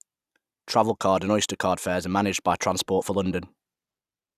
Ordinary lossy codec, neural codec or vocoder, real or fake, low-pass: Opus, 64 kbps; none; real; 14.4 kHz